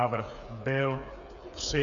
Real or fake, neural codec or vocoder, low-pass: fake; codec, 16 kHz, 8 kbps, FreqCodec, smaller model; 7.2 kHz